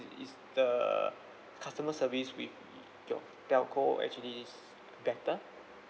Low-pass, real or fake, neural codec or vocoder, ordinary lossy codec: none; real; none; none